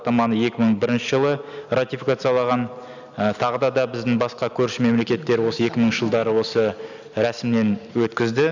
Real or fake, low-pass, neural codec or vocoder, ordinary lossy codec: real; 7.2 kHz; none; none